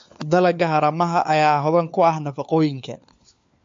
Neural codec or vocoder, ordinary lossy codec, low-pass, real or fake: codec, 16 kHz, 4 kbps, FunCodec, trained on LibriTTS, 50 frames a second; MP3, 48 kbps; 7.2 kHz; fake